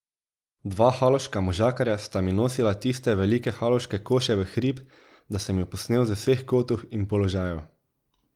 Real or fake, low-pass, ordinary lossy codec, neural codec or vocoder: real; 19.8 kHz; Opus, 32 kbps; none